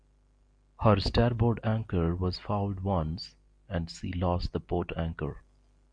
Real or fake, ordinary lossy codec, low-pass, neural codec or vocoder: real; MP3, 64 kbps; 9.9 kHz; none